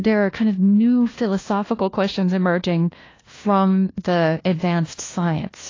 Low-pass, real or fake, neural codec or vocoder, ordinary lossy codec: 7.2 kHz; fake; codec, 16 kHz, 1 kbps, FunCodec, trained on LibriTTS, 50 frames a second; AAC, 32 kbps